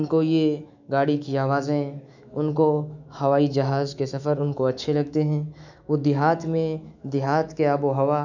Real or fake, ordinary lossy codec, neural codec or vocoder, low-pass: fake; none; autoencoder, 48 kHz, 128 numbers a frame, DAC-VAE, trained on Japanese speech; 7.2 kHz